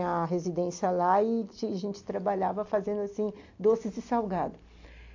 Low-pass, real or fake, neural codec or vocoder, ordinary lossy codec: 7.2 kHz; real; none; AAC, 48 kbps